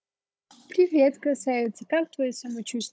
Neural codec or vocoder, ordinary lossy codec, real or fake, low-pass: codec, 16 kHz, 16 kbps, FunCodec, trained on Chinese and English, 50 frames a second; none; fake; none